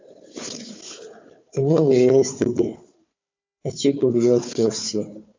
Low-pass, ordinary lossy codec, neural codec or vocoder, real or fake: 7.2 kHz; MP3, 48 kbps; codec, 16 kHz, 4 kbps, FunCodec, trained on Chinese and English, 50 frames a second; fake